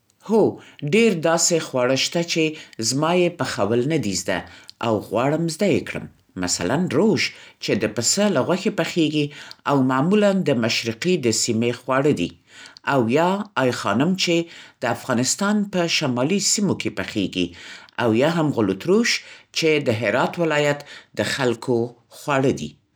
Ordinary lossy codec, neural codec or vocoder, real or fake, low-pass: none; none; real; none